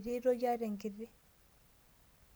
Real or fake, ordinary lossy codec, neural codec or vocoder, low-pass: real; none; none; none